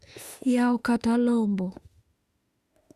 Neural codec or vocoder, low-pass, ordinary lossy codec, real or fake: autoencoder, 48 kHz, 32 numbers a frame, DAC-VAE, trained on Japanese speech; 14.4 kHz; Opus, 64 kbps; fake